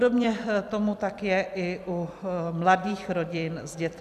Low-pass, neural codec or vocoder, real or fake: 14.4 kHz; vocoder, 44.1 kHz, 128 mel bands every 256 samples, BigVGAN v2; fake